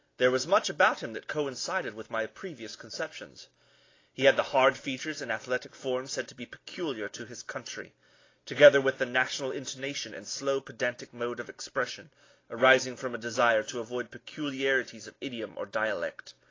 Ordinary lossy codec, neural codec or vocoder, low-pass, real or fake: AAC, 32 kbps; none; 7.2 kHz; real